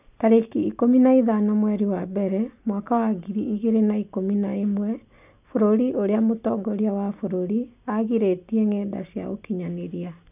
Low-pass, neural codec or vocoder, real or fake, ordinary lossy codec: 3.6 kHz; none; real; none